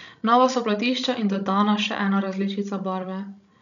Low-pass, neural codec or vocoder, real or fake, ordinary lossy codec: 7.2 kHz; codec, 16 kHz, 16 kbps, FreqCodec, larger model; fake; none